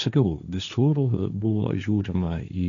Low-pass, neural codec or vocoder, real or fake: 7.2 kHz; codec, 16 kHz, 1.1 kbps, Voila-Tokenizer; fake